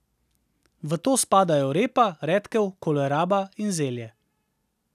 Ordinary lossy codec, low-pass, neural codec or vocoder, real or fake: none; 14.4 kHz; none; real